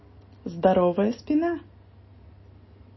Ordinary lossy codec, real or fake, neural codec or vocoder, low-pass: MP3, 24 kbps; real; none; 7.2 kHz